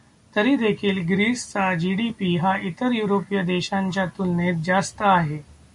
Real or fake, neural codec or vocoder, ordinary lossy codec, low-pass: real; none; MP3, 64 kbps; 10.8 kHz